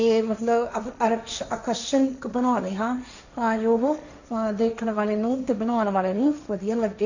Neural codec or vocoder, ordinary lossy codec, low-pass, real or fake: codec, 16 kHz, 1.1 kbps, Voila-Tokenizer; none; 7.2 kHz; fake